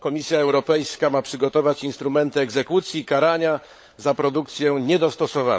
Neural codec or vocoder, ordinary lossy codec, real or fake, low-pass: codec, 16 kHz, 16 kbps, FunCodec, trained on LibriTTS, 50 frames a second; none; fake; none